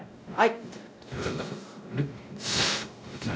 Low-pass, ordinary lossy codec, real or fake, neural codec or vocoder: none; none; fake; codec, 16 kHz, 0.5 kbps, X-Codec, WavLM features, trained on Multilingual LibriSpeech